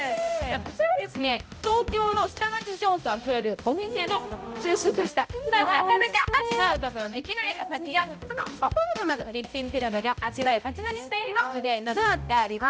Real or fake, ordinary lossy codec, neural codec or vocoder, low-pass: fake; none; codec, 16 kHz, 0.5 kbps, X-Codec, HuBERT features, trained on balanced general audio; none